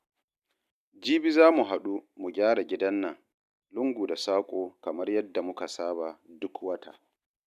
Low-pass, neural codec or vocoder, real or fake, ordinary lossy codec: 14.4 kHz; none; real; none